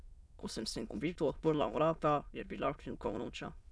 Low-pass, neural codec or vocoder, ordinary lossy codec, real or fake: none; autoencoder, 22.05 kHz, a latent of 192 numbers a frame, VITS, trained on many speakers; none; fake